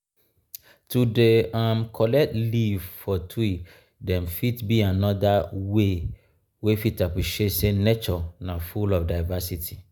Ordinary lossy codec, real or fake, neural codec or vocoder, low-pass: none; real; none; none